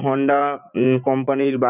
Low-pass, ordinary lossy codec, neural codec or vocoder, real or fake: 3.6 kHz; none; vocoder, 44.1 kHz, 80 mel bands, Vocos; fake